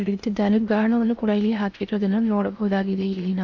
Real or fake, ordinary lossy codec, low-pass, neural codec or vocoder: fake; none; 7.2 kHz; codec, 16 kHz in and 24 kHz out, 0.8 kbps, FocalCodec, streaming, 65536 codes